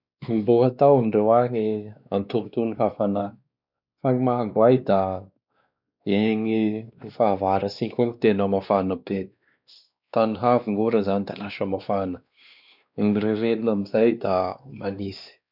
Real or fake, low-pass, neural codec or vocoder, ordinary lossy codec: fake; 5.4 kHz; codec, 16 kHz, 2 kbps, X-Codec, WavLM features, trained on Multilingual LibriSpeech; none